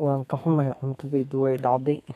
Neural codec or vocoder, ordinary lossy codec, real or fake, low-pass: codec, 32 kHz, 1.9 kbps, SNAC; none; fake; 14.4 kHz